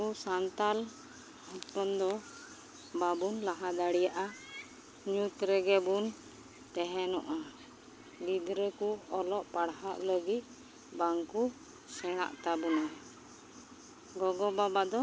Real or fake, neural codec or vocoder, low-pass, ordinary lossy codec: real; none; none; none